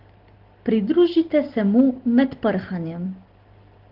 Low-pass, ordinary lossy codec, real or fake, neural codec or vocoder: 5.4 kHz; Opus, 16 kbps; real; none